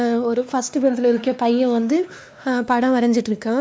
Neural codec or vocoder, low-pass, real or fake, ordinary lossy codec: codec, 16 kHz, 2 kbps, X-Codec, WavLM features, trained on Multilingual LibriSpeech; none; fake; none